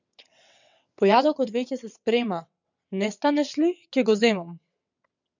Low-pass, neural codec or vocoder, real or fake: 7.2 kHz; vocoder, 22.05 kHz, 80 mel bands, WaveNeXt; fake